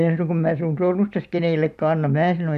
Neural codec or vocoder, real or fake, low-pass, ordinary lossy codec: vocoder, 44.1 kHz, 128 mel bands every 256 samples, BigVGAN v2; fake; 14.4 kHz; none